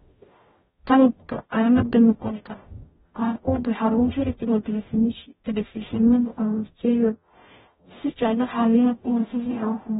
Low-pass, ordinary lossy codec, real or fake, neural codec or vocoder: 19.8 kHz; AAC, 16 kbps; fake; codec, 44.1 kHz, 0.9 kbps, DAC